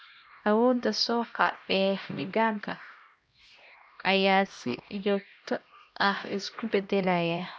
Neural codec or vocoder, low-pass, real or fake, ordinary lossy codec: codec, 16 kHz, 1 kbps, X-Codec, HuBERT features, trained on LibriSpeech; none; fake; none